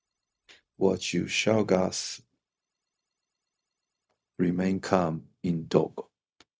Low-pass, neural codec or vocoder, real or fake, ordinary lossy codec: none; codec, 16 kHz, 0.4 kbps, LongCat-Audio-Codec; fake; none